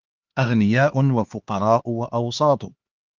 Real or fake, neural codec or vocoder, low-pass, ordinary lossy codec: fake; codec, 16 kHz, 2 kbps, X-Codec, HuBERT features, trained on LibriSpeech; 7.2 kHz; Opus, 32 kbps